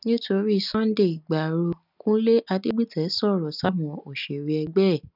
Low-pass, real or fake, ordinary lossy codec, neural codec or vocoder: 5.4 kHz; real; none; none